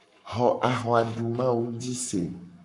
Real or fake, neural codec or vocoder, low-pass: fake; codec, 44.1 kHz, 7.8 kbps, Pupu-Codec; 10.8 kHz